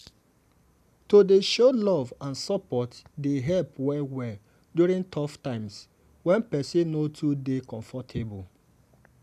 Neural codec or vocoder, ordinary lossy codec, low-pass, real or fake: none; none; 14.4 kHz; real